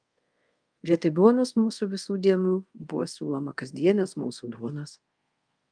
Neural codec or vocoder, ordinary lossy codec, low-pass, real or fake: codec, 24 kHz, 0.5 kbps, DualCodec; Opus, 32 kbps; 9.9 kHz; fake